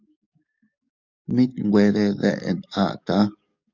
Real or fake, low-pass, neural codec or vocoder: fake; 7.2 kHz; codec, 44.1 kHz, 7.8 kbps, DAC